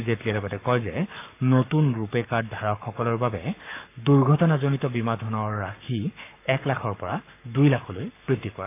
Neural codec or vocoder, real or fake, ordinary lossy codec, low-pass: codec, 44.1 kHz, 7.8 kbps, Pupu-Codec; fake; AAC, 24 kbps; 3.6 kHz